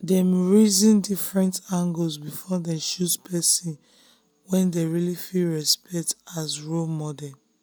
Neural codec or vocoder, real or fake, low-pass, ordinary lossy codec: none; real; none; none